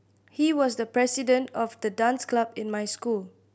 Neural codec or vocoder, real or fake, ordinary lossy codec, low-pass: none; real; none; none